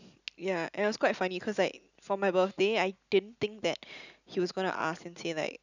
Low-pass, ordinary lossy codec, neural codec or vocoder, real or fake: 7.2 kHz; none; none; real